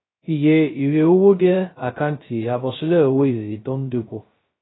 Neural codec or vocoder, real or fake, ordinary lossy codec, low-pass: codec, 16 kHz, 0.2 kbps, FocalCodec; fake; AAC, 16 kbps; 7.2 kHz